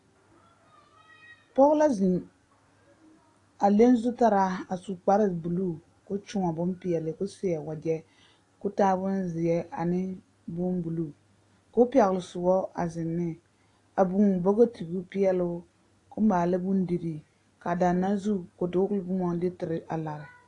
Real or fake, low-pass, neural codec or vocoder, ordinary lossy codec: real; 10.8 kHz; none; AAC, 48 kbps